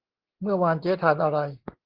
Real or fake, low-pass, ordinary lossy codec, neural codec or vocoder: real; 5.4 kHz; Opus, 16 kbps; none